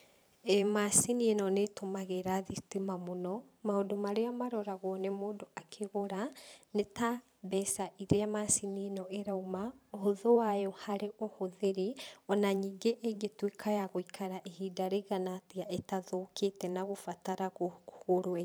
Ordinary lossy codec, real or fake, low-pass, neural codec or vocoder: none; fake; none; vocoder, 44.1 kHz, 128 mel bands every 512 samples, BigVGAN v2